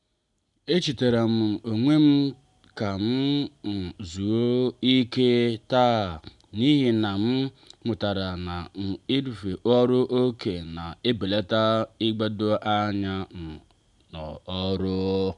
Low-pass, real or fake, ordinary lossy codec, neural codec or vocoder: 10.8 kHz; real; none; none